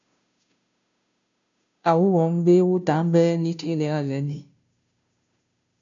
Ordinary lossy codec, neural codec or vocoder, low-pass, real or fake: AAC, 64 kbps; codec, 16 kHz, 0.5 kbps, FunCodec, trained on Chinese and English, 25 frames a second; 7.2 kHz; fake